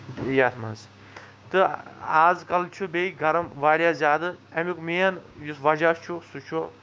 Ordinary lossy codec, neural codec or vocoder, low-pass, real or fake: none; codec, 16 kHz, 6 kbps, DAC; none; fake